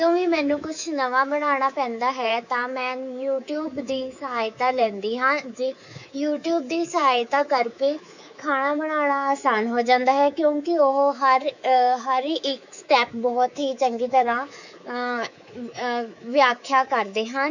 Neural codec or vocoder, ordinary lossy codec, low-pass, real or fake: codec, 24 kHz, 3.1 kbps, DualCodec; none; 7.2 kHz; fake